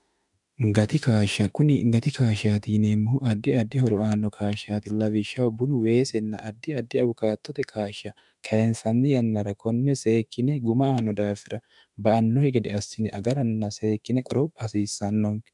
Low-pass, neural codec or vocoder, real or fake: 10.8 kHz; autoencoder, 48 kHz, 32 numbers a frame, DAC-VAE, trained on Japanese speech; fake